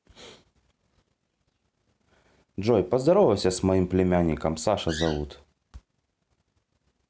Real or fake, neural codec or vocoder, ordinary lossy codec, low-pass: real; none; none; none